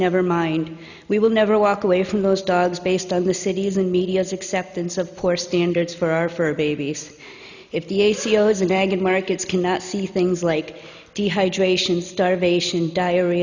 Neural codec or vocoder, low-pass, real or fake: none; 7.2 kHz; real